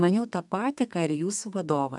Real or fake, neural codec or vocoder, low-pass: fake; codec, 32 kHz, 1.9 kbps, SNAC; 10.8 kHz